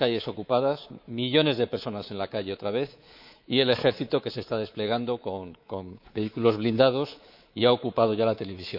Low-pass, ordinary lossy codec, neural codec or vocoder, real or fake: 5.4 kHz; none; codec, 24 kHz, 3.1 kbps, DualCodec; fake